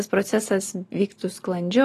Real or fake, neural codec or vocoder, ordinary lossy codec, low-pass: real; none; AAC, 48 kbps; 14.4 kHz